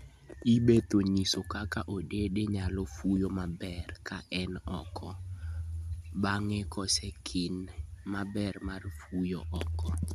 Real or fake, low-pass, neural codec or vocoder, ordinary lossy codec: real; 14.4 kHz; none; none